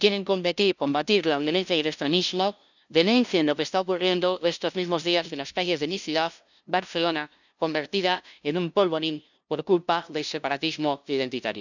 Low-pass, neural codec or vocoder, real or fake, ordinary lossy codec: 7.2 kHz; codec, 16 kHz, 0.5 kbps, FunCodec, trained on LibriTTS, 25 frames a second; fake; none